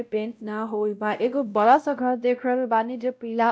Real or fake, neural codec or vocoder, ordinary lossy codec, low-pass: fake; codec, 16 kHz, 0.5 kbps, X-Codec, WavLM features, trained on Multilingual LibriSpeech; none; none